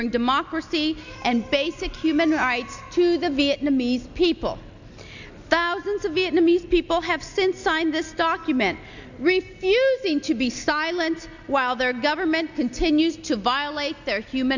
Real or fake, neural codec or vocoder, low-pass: real; none; 7.2 kHz